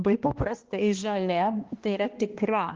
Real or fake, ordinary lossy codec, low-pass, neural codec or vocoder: fake; Opus, 16 kbps; 7.2 kHz; codec, 16 kHz, 1 kbps, X-Codec, HuBERT features, trained on balanced general audio